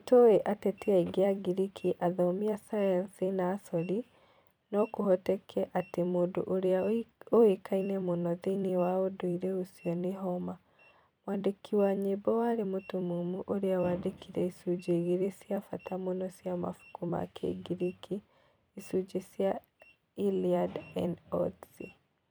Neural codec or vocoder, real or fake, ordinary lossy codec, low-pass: vocoder, 44.1 kHz, 128 mel bands every 256 samples, BigVGAN v2; fake; none; none